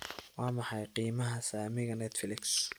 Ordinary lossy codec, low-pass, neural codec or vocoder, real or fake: none; none; none; real